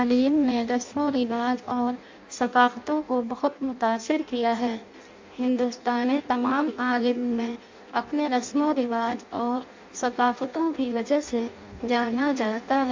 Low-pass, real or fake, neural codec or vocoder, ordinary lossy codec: 7.2 kHz; fake; codec, 16 kHz in and 24 kHz out, 0.6 kbps, FireRedTTS-2 codec; MP3, 64 kbps